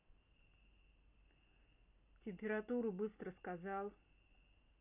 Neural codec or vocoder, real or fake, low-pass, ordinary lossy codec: none; real; 3.6 kHz; none